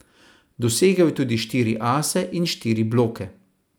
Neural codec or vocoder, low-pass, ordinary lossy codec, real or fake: none; none; none; real